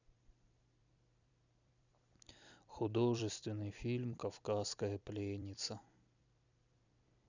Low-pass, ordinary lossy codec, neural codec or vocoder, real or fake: 7.2 kHz; none; vocoder, 44.1 kHz, 128 mel bands every 256 samples, BigVGAN v2; fake